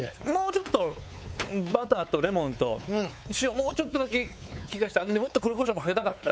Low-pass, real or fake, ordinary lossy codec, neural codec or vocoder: none; fake; none; codec, 16 kHz, 4 kbps, X-Codec, WavLM features, trained on Multilingual LibriSpeech